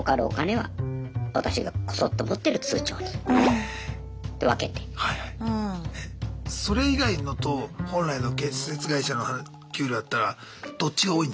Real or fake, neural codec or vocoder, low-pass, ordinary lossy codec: real; none; none; none